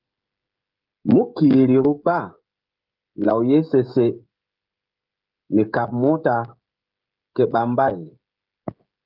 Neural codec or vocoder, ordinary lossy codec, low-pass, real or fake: codec, 16 kHz, 16 kbps, FreqCodec, smaller model; Opus, 32 kbps; 5.4 kHz; fake